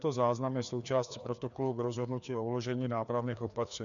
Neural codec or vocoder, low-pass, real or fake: codec, 16 kHz, 2 kbps, FreqCodec, larger model; 7.2 kHz; fake